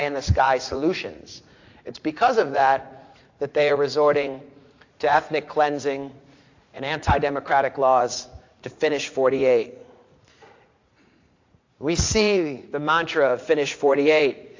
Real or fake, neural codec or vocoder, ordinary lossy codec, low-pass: fake; vocoder, 44.1 kHz, 80 mel bands, Vocos; AAC, 48 kbps; 7.2 kHz